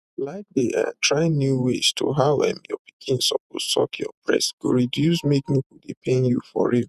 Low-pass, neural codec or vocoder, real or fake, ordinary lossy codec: 14.4 kHz; vocoder, 48 kHz, 128 mel bands, Vocos; fake; none